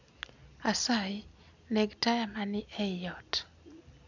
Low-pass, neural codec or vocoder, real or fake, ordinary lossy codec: 7.2 kHz; none; real; none